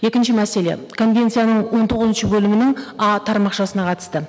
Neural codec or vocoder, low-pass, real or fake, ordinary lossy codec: none; none; real; none